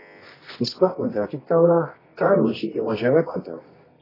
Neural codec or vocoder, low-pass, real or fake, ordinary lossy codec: codec, 24 kHz, 0.9 kbps, WavTokenizer, medium music audio release; 5.4 kHz; fake; AAC, 24 kbps